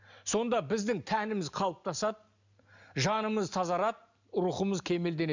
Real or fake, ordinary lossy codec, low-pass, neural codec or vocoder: real; none; 7.2 kHz; none